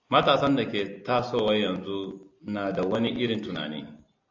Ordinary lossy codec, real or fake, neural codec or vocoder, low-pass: AAC, 48 kbps; real; none; 7.2 kHz